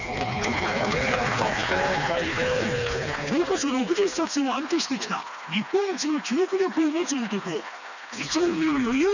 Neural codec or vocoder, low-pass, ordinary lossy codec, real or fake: codec, 16 kHz, 2 kbps, FreqCodec, smaller model; 7.2 kHz; none; fake